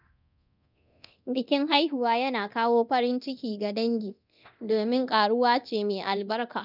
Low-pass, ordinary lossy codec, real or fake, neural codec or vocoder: 5.4 kHz; none; fake; codec, 24 kHz, 0.9 kbps, DualCodec